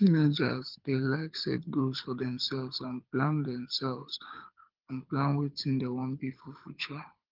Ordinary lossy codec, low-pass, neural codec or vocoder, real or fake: Opus, 32 kbps; 5.4 kHz; codec, 24 kHz, 6 kbps, HILCodec; fake